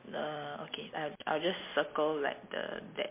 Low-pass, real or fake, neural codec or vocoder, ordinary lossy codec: 3.6 kHz; real; none; MP3, 24 kbps